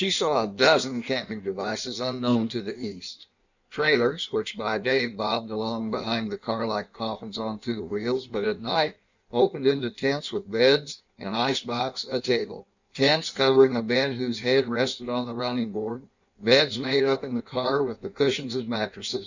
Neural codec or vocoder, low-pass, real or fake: codec, 16 kHz in and 24 kHz out, 1.1 kbps, FireRedTTS-2 codec; 7.2 kHz; fake